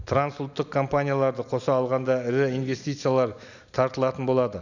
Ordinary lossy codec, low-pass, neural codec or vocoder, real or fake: none; 7.2 kHz; none; real